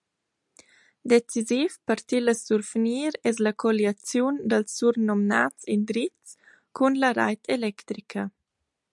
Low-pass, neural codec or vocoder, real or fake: 10.8 kHz; none; real